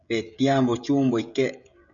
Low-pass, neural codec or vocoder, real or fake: 7.2 kHz; codec, 16 kHz, 16 kbps, FreqCodec, smaller model; fake